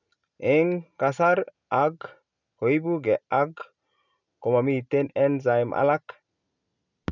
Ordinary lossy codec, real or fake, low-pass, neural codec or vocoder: none; real; 7.2 kHz; none